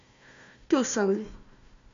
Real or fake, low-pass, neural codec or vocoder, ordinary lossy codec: fake; 7.2 kHz; codec, 16 kHz, 1 kbps, FunCodec, trained on Chinese and English, 50 frames a second; MP3, 64 kbps